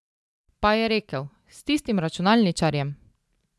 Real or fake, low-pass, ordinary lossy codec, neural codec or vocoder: real; none; none; none